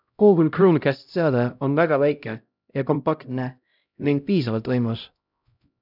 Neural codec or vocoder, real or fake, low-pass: codec, 16 kHz, 0.5 kbps, X-Codec, HuBERT features, trained on LibriSpeech; fake; 5.4 kHz